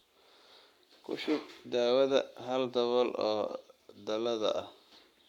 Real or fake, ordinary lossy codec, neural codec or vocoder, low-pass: fake; none; codec, 44.1 kHz, 7.8 kbps, Pupu-Codec; 19.8 kHz